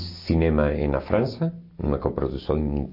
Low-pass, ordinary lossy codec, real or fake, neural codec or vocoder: 5.4 kHz; MP3, 32 kbps; real; none